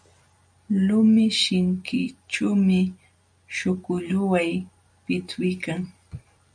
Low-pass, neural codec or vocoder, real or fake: 9.9 kHz; none; real